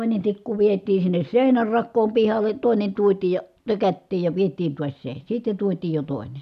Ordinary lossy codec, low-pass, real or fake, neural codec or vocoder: none; 14.4 kHz; real; none